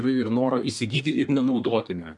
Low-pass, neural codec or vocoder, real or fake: 10.8 kHz; codec, 24 kHz, 1 kbps, SNAC; fake